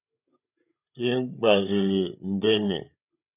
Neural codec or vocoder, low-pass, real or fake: codec, 16 kHz, 16 kbps, FreqCodec, larger model; 3.6 kHz; fake